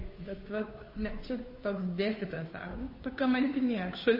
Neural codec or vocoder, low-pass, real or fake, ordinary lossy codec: codec, 16 kHz, 2 kbps, FunCodec, trained on Chinese and English, 25 frames a second; 5.4 kHz; fake; MP3, 24 kbps